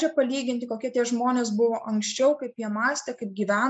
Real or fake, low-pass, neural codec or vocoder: real; 9.9 kHz; none